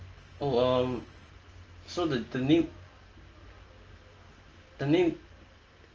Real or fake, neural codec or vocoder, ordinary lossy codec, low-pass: real; none; Opus, 24 kbps; 7.2 kHz